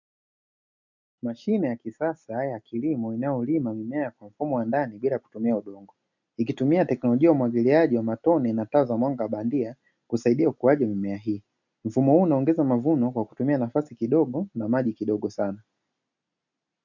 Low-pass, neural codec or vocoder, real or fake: 7.2 kHz; none; real